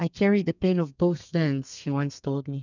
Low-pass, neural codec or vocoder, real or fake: 7.2 kHz; codec, 32 kHz, 1.9 kbps, SNAC; fake